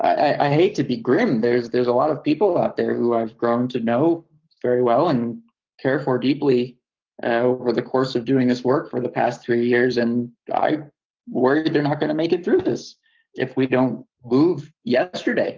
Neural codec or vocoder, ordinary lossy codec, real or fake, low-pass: codec, 44.1 kHz, 7.8 kbps, Pupu-Codec; Opus, 24 kbps; fake; 7.2 kHz